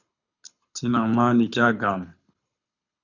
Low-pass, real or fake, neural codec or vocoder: 7.2 kHz; fake; codec, 24 kHz, 6 kbps, HILCodec